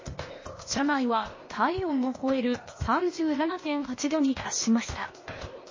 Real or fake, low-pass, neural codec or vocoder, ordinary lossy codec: fake; 7.2 kHz; codec, 16 kHz, 0.8 kbps, ZipCodec; MP3, 32 kbps